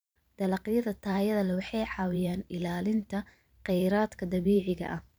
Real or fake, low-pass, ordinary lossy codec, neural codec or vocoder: fake; none; none; vocoder, 44.1 kHz, 128 mel bands every 512 samples, BigVGAN v2